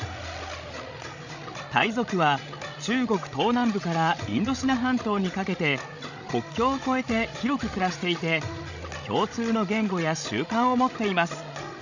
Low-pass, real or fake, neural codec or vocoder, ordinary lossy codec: 7.2 kHz; fake; codec, 16 kHz, 16 kbps, FreqCodec, larger model; none